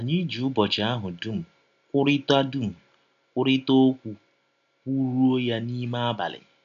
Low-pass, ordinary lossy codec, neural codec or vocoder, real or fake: 7.2 kHz; none; none; real